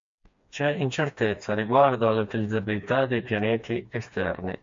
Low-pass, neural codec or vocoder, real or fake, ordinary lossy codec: 7.2 kHz; codec, 16 kHz, 2 kbps, FreqCodec, smaller model; fake; MP3, 48 kbps